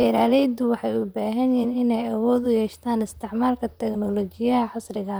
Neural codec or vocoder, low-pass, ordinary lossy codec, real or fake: vocoder, 44.1 kHz, 128 mel bands, Pupu-Vocoder; none; none; fake